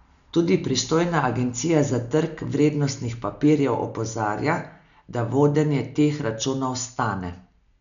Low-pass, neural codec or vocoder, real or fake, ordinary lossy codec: 7.2 kHz; none; real; none